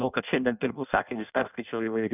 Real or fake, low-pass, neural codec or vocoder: fake; 3.6 kHz; codec, 16 kHz in and 24 kHz out, 0.6 kbps, FireRedTTS-2 codec